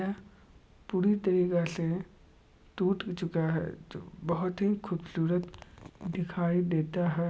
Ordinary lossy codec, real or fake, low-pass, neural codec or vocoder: none; real; none; none